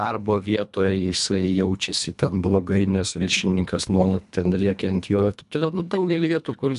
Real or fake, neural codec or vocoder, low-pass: fake; codec, 24 kHz, 1.5 kbps, HILCodec; 10.8 kHz